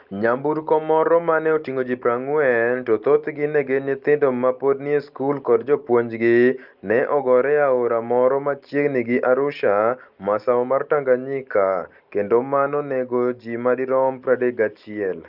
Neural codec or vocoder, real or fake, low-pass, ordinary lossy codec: none; real; 5.4 kHz; Opus, 32 kbps